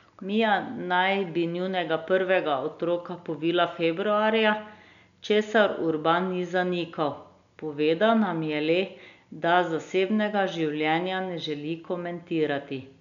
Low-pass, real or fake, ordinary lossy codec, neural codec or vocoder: 7.2 kHz; real; MP3, 96 kbps; none